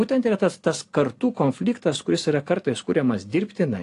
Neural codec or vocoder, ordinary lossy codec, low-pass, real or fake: none; AAC, 48 kbps; 10.8 kHz; real